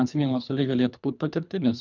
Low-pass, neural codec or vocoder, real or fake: 7.2 kHz; codec, 24 kHz, 3 kbps, HILCodec; fake